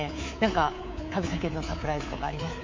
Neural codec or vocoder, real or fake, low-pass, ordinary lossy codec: codec, 24 kHz, 3.1 kbps, DualCodec; fake; 7.2 kHz; MP3, 48 kbps